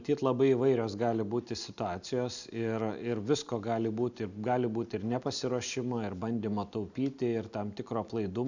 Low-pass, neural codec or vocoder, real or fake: 7.2 kHz; none; real